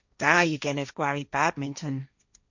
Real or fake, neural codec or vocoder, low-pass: fake; codec, 16 kHz, 1.1 kbps, Voila-Tokenizer; 7.2 kHz